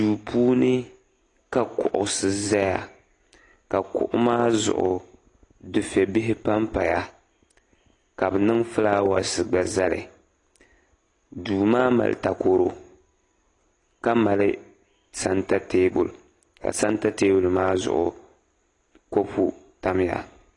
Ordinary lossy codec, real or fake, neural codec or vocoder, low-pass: AAC, 32 kbps; real; none; 10.8 kHz